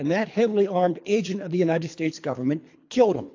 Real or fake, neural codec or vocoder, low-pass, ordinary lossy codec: fake; codec, 24 kHz, 3 kbps, HILCodec; 7.2 kHz; AAC, 48 kbps